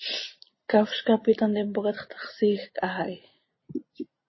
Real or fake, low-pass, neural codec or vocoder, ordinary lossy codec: real; 7.2 kHz; none; MP3, 24 kbps